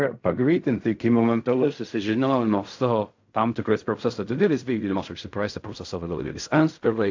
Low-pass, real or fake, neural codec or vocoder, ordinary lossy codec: 7.2 kHz; fake; codec, 16 kHz in and 24 kHz out, 0.4 kbps, LongCat-Audio-Codec, fine tuned four codebook decoder; AAC, 48 kbps